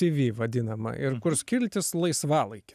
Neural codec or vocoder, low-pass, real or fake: none; 14.4 kHz; real